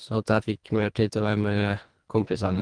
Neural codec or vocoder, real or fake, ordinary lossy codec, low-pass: codec, 24 kHz, 1.5 kbps, HILCodec; fake; Opus, 32 kbps; 9.9 kHz